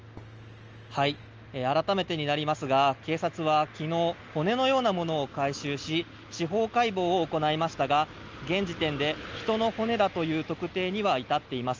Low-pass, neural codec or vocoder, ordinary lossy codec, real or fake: 7.2 kHz; none; Opus, 24 kbps; real